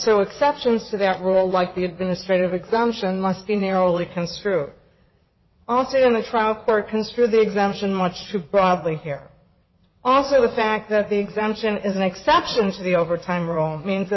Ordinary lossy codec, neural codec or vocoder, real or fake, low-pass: MP3, 24 kbps; vocoder, 22.05 kHz, 80 mel bands, WaveNeXt; fake; 7.2 kHz